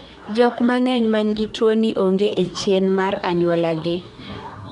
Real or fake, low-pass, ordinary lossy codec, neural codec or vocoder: fake; 10.8 kHz; none; codec, 24 kHz, 1 kbps, SNAC